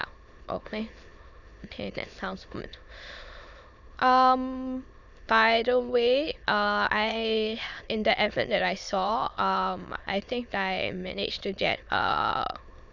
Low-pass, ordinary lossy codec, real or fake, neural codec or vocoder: 7.2 kHz; none; fake; autoencoder, 22.05 kHz, a latent of 192 numbers a frame, VITS, trained on many speakers